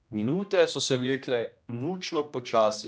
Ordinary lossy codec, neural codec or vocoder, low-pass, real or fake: none; codec, 16 kHz, 1 kbps, X-Codec, HuBERT features, trained on general audio; none; fake